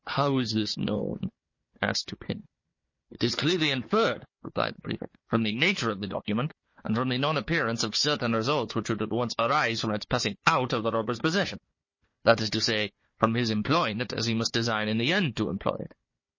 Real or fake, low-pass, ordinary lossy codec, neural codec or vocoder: fake; 7.2 kHz; MP3, 32 kbps; codec, 16 kHz, 4 kbps, FunCodec, trained on Chinese and English, 50 frames a second